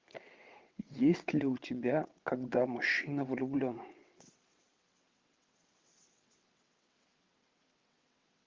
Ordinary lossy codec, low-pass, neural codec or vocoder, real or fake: Opus, 32 kbps; 7.2 kHz; vocoder, 22.05 kHz, 80 mel bands, WaveNeXt; fake